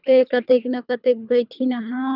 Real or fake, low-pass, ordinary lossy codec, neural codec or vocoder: fake; 5.4 kHz; none; codec, 24 kHz, 3 kbps, HILCodec